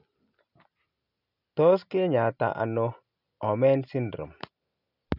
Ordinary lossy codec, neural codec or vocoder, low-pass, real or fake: none; none; 5.4 kHz; real